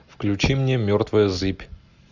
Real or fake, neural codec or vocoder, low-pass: real; none; 7.2 kHz